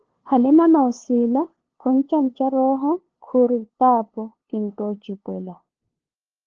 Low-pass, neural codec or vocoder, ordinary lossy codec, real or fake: 7.2 kHz; codec, 16 kHz, 2 kbps, FunCodec, trained on LibriTTS, 25 frames a second; Opus, 16 kbps; fake